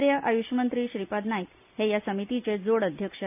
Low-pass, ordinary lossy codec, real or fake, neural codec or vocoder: 3.6 kHz; none; real; none